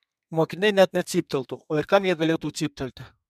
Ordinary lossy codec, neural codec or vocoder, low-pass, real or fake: MP3, 96 kbps; codec, 32 kHz, 1.9 kbps, SNAC; 14.4 kHz; fake